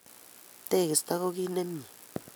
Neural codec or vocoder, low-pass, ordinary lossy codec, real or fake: none; none; none; real